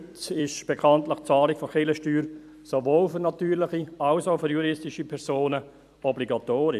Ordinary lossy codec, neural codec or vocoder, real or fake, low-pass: AAC, 96 kbps; vocoder, 44.1 kHz, 128 mel bands every 256 samples, BigVGAN v2; fake; 14.4 kHz